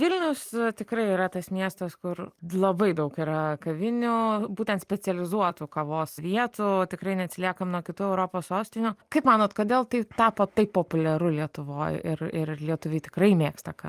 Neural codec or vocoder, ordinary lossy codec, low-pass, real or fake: none; Opus, 32 kbps; 14.4 kHz; real